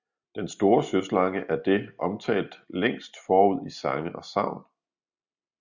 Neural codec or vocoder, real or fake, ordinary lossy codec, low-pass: none; real; Opus, 64 kbps; 7.2 kHz